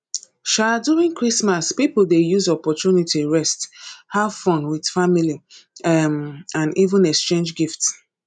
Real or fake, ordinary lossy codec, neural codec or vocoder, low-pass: real; none; none; 9.9 kHz